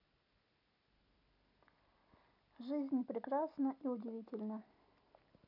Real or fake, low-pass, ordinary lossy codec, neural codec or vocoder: real; 5.4 kHz; none; none